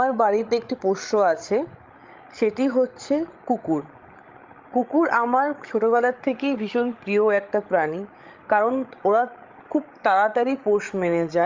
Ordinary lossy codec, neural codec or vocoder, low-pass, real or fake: Opus, 32 kbps; codec, 16 kHz, 16 kbps, FreqCodec, larger model; 7.2 kHz; fake